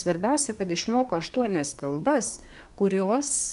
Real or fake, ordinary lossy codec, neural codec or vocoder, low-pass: fake; MP3, 96 kbps; codec, 24 kHz, 1 kbps, SNAC; 10.8 kHz